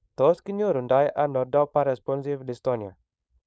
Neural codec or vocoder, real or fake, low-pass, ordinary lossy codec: codec, 16 kHz, 4.8 kbps, FACodec; fake; none; none